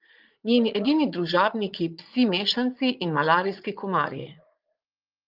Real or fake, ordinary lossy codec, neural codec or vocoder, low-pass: fake; Opus, 24 kbps; vocoder, 44.1 kHz, 80 mel bands, Vocos; 5.4 kHz